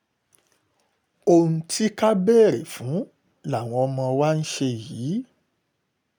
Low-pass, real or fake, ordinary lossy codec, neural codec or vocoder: none; real; none; none